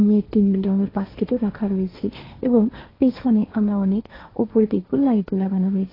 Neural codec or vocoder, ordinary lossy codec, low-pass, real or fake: codec, 16 kHz, 1.1 kbps, Voila-Tokenizer; AAC, 24 kbps; 5.4 kHz; fake